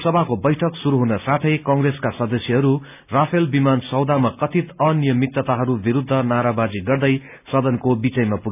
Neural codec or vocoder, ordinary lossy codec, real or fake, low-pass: none; none; real; 3.6 kHz